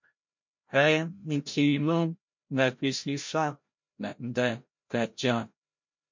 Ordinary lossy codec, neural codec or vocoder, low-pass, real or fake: MP3, 48 kbps; codec, 16 kHz, 0.5 kbps, FreqCodec, larger model; 7.2 kHz; fake